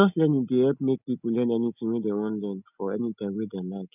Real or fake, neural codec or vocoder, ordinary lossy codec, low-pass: real; none; none; 3.6 kHz